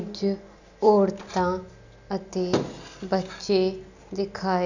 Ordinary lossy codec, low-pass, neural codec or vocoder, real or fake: none; 7.2 kHz; none; real